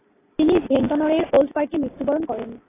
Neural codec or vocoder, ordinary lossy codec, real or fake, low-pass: none; AAC, 16 kbps; real; 3.6 kHz